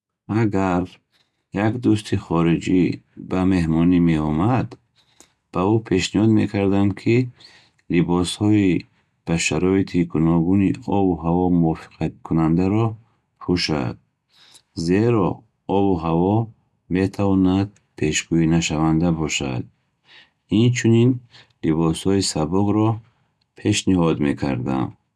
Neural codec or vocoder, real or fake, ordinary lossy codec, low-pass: none; real; none; none